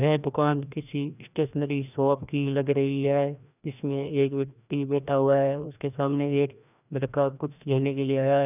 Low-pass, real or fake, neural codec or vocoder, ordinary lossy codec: 3.6 kHz; fake; codec, 16 kHz, 1 kbps, FreqCodec, larger model; none